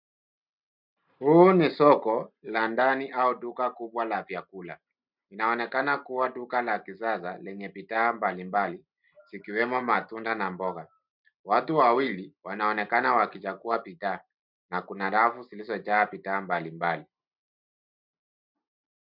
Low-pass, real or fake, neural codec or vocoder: 5.4 kHz; real; none